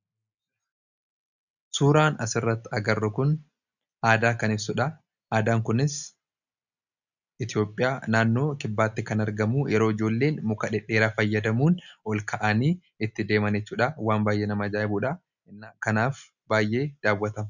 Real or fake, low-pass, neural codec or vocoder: real; 7.2 kHz; none